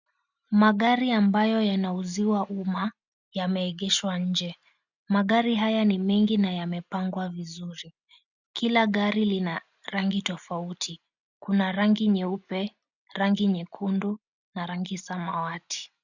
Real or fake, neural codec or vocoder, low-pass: real; none; 7.2 kHz